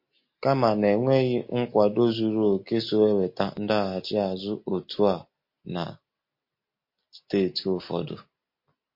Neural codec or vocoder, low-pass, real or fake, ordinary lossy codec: none; 5.4 kHz; real; MP3, 32 kbps